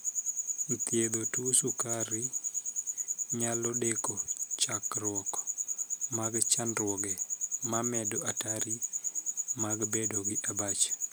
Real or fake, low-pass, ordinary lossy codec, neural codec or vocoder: real; none; none; none